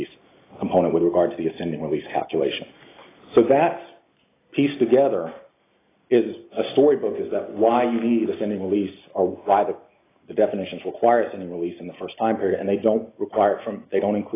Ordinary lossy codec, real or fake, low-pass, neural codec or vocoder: AAC, 16 kbps; real; 3.6 kHz; none